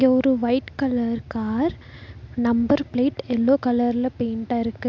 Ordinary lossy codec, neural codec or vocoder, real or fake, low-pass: MP3, 64 kbps; none; real; 7.2 kHz